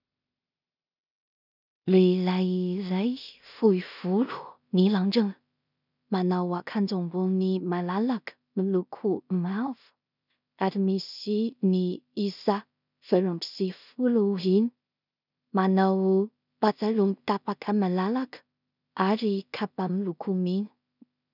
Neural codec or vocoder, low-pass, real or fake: codec, 16 kHz in and 24 kHz out, 0.4 kbps, LongCat-Audio-Codec, two codebook decoder; 5.4 kHz; fake